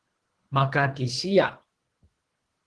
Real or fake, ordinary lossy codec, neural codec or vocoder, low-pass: fake; Opus, 16 kbps; codec, 24 kHz, 3 kbps, HILCodec; 10.8 kHz